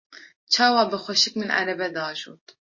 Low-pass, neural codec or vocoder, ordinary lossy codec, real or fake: 7.2 kHz; none; MP3, 32 kbps; real